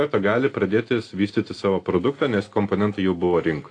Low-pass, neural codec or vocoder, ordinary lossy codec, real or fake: 9.9 kHz; none; AAC, 48 kbps; real